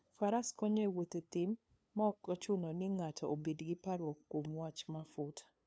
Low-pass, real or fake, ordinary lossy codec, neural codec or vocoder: none; fake; none; codec, 16 kHz, 2 kbps, FunCodec, trained on LibriTTS, 25 frames a second